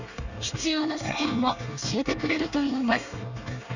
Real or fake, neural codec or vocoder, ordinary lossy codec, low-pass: fake; codec, 24 kHz, 1 kbps, SNAC; none; 7.2 kHz